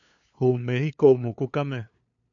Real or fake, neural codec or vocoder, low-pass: fake; codec, 16 kHz, 2 kbps, FunCodec, trained on LibriTTS, 25 frames a second; 7.2 kHz